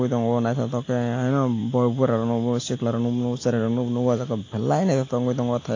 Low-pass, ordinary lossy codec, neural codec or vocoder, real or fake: 7.2 kHz; AAC, 32 kbps; none; real